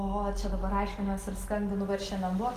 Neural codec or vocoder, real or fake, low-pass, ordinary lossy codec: none; real; 14.4 kHz; Opus, 24 kbps